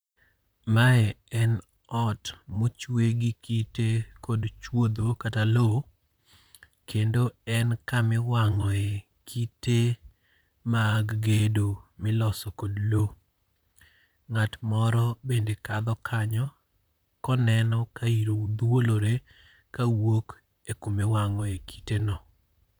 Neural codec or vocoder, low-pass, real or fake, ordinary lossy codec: vocoder, 44.1 kHz, 128 mel bands, Pupu-Vocoder; none; fake; none